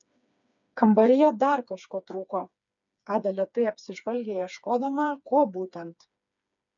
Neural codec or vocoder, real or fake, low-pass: codec, 16 kHz, 4 kbps, FreqCodec, smaller model; fake; 7.2 kHz